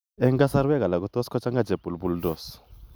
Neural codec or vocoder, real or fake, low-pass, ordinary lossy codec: none; real; none; none